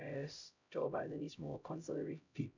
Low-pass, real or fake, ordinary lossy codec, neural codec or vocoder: 7.2 kHz; fake; none; codec, 16 kHz, 0.5 kbps, X-Codec, HuBERT features, trained on LibriSpeech